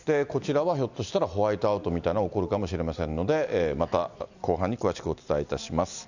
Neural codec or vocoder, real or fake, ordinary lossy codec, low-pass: none; real; none; 7.2 kHz